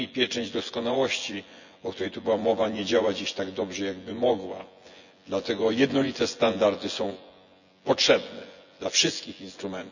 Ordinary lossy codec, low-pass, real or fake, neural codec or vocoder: none; 7.2 kHz; fake; vocoder, 24 kHz, 100 mel bands, Vocos